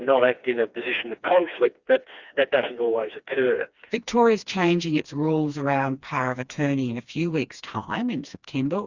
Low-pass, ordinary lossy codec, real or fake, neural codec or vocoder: 7.2 kHz; Opus, 64 kbps; fake; codec, 16 kHz, 2 kbps, FreqCodec, smaller model